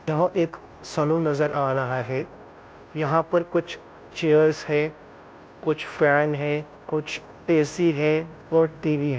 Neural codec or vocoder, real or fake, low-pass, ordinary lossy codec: codec, 16 kHz, 0.5 kbps, FunCodec, trained on Chinese and English, 25 frames a second; fake; none; none